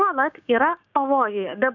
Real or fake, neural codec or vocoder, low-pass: fake; codec, 16 kHz, 6 kbps, DAC; 7.2 kHz